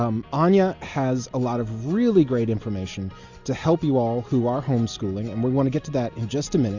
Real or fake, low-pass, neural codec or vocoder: real; 7.2 kHz; none